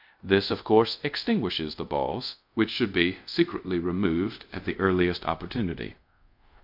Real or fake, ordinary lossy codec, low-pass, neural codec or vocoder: fake; MP3, 48 kbps; 5.4 kHz; codec, 24 kHz, 0.5 kbps, DualCodec